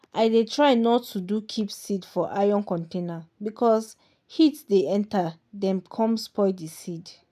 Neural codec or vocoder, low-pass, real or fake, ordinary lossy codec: none; 14.4 kHz; real; none